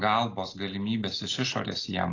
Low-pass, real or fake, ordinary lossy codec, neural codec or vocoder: 7.2 kHz; real; AAC, 32 kbps; none